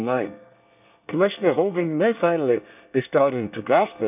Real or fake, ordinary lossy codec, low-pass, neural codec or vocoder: fake; none; 3.6 kHz; codec, 24 kHz, 1 kbps, SNAC